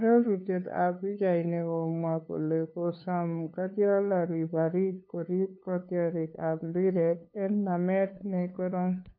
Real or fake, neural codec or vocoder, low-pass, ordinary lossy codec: fake; codec, 16 kHz, 2 kbps, FunCodec, trained on LibriTTS, 25 frames a second; 5.4 kHz; MP3, 32 kbps